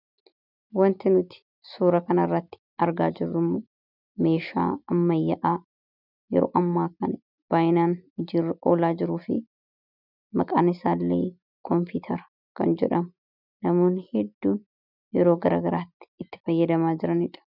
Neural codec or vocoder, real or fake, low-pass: none; real; 5.4 kHz